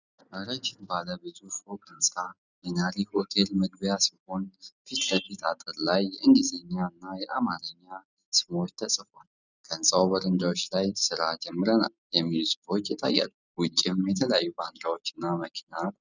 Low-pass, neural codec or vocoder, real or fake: 7.2 kHz; none; real